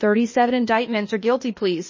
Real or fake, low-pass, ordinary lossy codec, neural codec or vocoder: fake; 7.2 kHz; MP3, 32 kbps; codec, 16 kHz, 0.8 kbps, ZipCodec